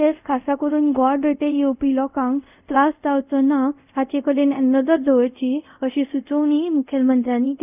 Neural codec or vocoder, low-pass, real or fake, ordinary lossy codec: codec, 24 kHz, 0.5 kbps, DualCodec; 3.6 kHz; fake; none